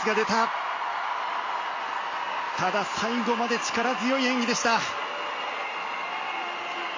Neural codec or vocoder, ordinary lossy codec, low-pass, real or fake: none; MP3, 32 kbps; 7.2 kHz; real